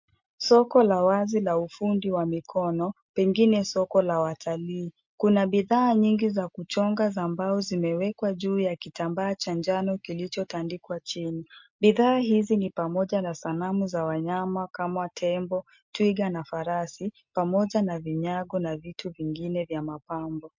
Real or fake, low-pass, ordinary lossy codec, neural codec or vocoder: real; 7.2 kHz; MP3, 48 kbps; none